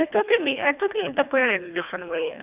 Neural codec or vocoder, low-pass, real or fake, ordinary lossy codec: codec, 24 kHz, 1.5 kbps, HILCodec; 3.6 kHz; fake; none